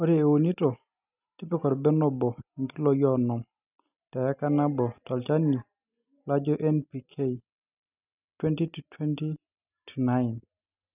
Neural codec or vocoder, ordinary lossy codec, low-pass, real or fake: none; none; 3.6 kHz; real